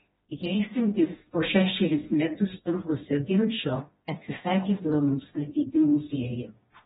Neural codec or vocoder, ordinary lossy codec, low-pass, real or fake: codec, 16 kHz, 1 kbps, FreqCodec, smaller model; AAC, 16 kbps; 7.2 kHz; fake